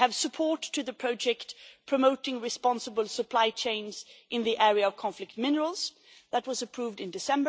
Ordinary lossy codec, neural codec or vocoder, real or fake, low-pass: none; none; real; none